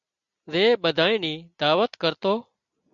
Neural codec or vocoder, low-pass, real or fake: none; 7.2 kHz; real